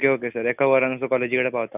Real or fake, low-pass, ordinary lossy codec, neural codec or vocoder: real; 3.6 kHz; none; none